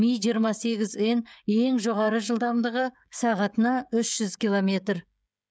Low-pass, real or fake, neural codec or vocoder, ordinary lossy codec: none; fake; codec, 16 kHz, 16 kbps, FreqCodec, smaller model; none